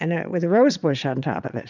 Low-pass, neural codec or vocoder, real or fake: 7.2 kHz; vocoder, 22.05 kHz, 80 mel bands, Vocos; fake